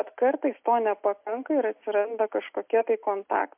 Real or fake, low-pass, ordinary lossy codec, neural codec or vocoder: real; 3.6 kHz; MP3, 32 kbps; none